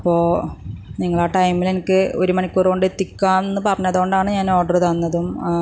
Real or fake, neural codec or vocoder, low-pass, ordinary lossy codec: real; none; none; none